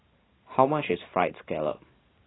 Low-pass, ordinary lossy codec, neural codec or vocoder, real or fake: 7.2 kHz; AAC, 16 kbps; none; real